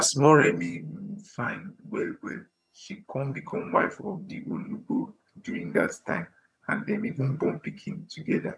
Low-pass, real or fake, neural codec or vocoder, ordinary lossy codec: none; fake; vocoder, 22.05 kHz, 80 mel bands, HiFi-GAN; none